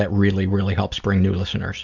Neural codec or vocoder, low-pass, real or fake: none; 7.2 kHz; real